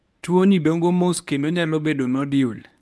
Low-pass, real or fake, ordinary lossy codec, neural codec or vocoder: none; fake; none; codec, 24 kHz, 0.9 kbps, WavTokenizer, medium speech release version 1